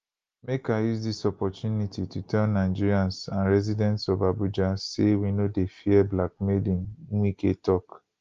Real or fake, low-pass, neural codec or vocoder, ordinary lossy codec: real; 7.2 kHz; none; Opus, 32 kbps